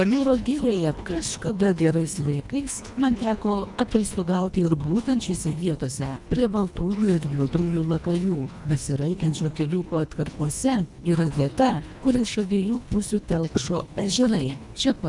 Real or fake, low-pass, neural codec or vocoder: fake; 10.8 kHz; codec, 24 kHz, 1.5 kbps, HILCodec